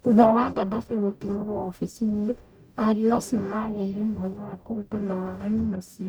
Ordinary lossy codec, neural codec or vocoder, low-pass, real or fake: none; codec, 44.1 kHz, 0.9 kbps, DAC; none; fake